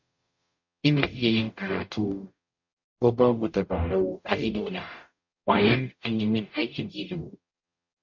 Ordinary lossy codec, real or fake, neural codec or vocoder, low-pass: MP3, 48 kbps; fake; codec, 44.1 kHz, 0.9 kbps, DAC; 7.2 kHz